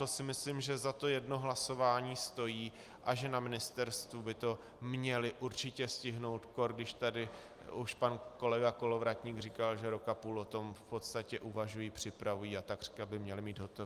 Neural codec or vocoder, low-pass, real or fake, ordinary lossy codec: none; 14.4 kHz; real; Opus, 32 kbps